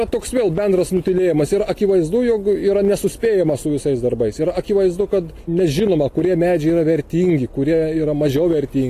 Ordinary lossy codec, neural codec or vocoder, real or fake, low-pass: AAC, 48 kbps; none; real; 14.4 kHz